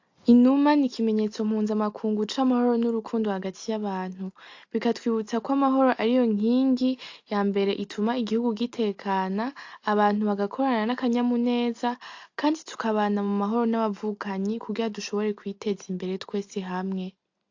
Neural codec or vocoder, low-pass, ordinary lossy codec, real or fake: none; 7.2 kHz; AAC, 48 kbps; real